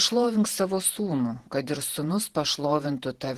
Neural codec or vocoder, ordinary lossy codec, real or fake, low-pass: vocoder, 48 kHz, 128 mel bands, Vocos; Opus, 16 kbps; fake; 14.4 kHz